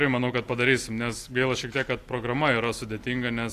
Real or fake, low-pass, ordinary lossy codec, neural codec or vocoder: real; 14.4 kHz; AAC, 64 kbps; none